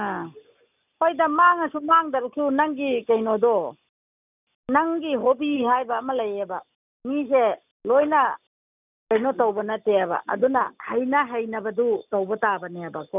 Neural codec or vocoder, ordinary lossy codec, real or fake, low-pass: none; none; real; 3.6 kHz